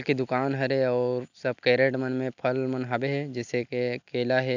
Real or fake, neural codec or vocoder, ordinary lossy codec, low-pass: real; none; none; 7.2 kHz